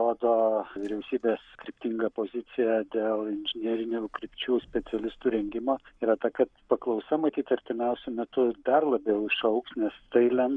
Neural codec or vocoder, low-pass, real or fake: codec, 44.1 kHz, 7.8 kbps, Pupu-Codec; 9.9 kHz; fake